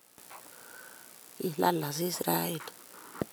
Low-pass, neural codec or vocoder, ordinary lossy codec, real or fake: none; none; none; real